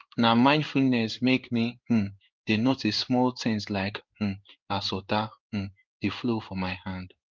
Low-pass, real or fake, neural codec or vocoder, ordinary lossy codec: 7.2 kHz; fake; codec, 16 kHz in and 24 kHz out, 1 kbps, XY-Tokenizer; Opus, 24 kbps